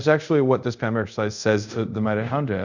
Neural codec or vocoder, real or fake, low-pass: codec, 24 kHz, 0.5 kbps, DualCodec; fake; 7.2 kHz